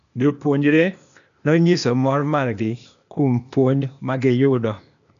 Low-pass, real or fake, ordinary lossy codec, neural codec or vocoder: 7.2 kHz; fake; none; codec, 16 kHz, 0.8 kbps, ZipCodec